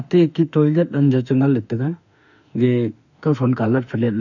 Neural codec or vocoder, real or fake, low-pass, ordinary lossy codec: autoencoder, 48 kHz, 32 numbers a frame, DAC-VAE, trained on Japanese speech; fake; 7.2 kHz; none